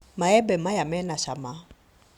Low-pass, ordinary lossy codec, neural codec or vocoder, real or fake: 19.8 kHz; Opus, 64 kbps; none; real